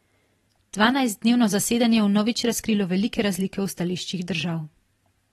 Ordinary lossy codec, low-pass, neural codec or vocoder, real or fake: AAC, 32 kbps; 19.8 kHz; vocoder, 44.1 kHz, 128 mel bands every 512 samples, BigVGAN v2; fake